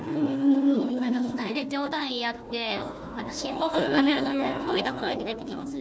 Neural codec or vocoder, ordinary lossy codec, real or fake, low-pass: codec, 16 kHz, 1 kbps, FunCodec, trained on Chinese and English, 50 frames a second; none; fake; none